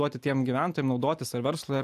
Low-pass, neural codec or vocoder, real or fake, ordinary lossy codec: 14.4 kHz; none; real; AAC, 96 kbps